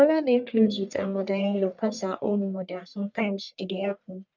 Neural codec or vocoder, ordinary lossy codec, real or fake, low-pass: codec, 44.1 kHz, 1.7 kbps, Pupu-Codec; none; fake; 7.2 kHz